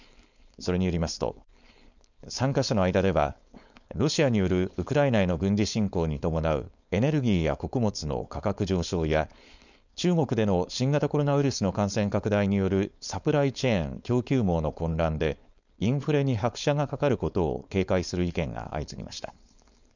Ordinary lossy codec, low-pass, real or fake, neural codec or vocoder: none; 7.2 kHz; fake; codec, 16 kHz, 4.8 kbps, FACodec